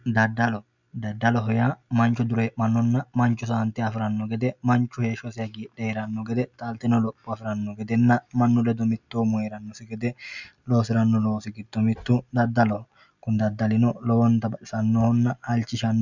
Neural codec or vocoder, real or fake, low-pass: none; real; 7.2 kHz